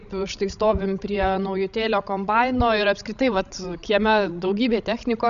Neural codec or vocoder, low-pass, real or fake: codec, 16 kHz, 16 kbps, FreqCodec, larger model; 7.2 kHz; fake